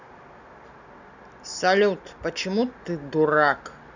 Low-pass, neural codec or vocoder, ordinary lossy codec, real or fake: 7.2 kHz; none; none; real